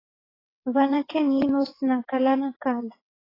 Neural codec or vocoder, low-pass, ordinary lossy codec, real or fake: vocoder, 22.05 kHz, 80 mel bands, WaveNeXt; 5.4 kHz; AAC, 24 kbps; fake